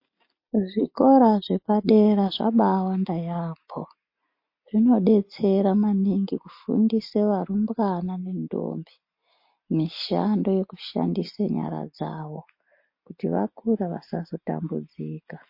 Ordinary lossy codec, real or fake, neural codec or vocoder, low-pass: MP3, 32 kbps; real; none; 5.4 kHz